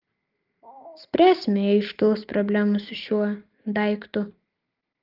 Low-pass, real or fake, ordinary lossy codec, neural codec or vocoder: 5.4 kHz; real; Opus, 32 kbps; none